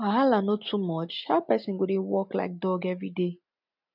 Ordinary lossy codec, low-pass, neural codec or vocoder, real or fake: none; 5.4 kHz; none; real